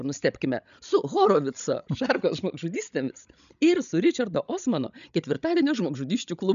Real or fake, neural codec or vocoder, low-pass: fake; codec, 16 kHz, 16 kbps, FreqCodec, larger model; 7.2 kHz